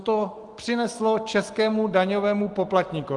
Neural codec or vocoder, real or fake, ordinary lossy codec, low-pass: none; real; Opus, 24 kbps; 10.8 kHz